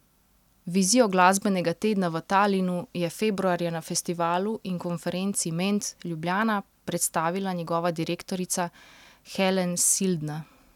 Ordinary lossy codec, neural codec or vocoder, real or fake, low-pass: none; none; real; 19.8 kHz